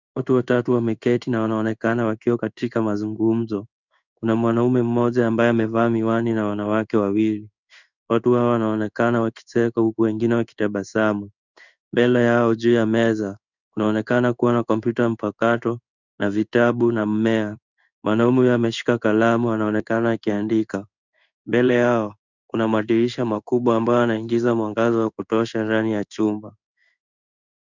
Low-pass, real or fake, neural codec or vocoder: 7.2 kHz; fake; codec, 16 kHz in and 24 kHz out, 1 kbps, XY-Tokenizer